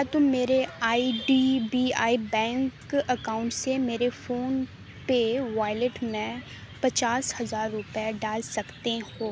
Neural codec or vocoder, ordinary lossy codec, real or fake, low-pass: none; none; real; none